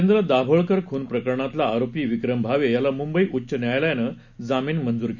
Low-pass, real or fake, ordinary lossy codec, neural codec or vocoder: 7.2 kHz; real; none; none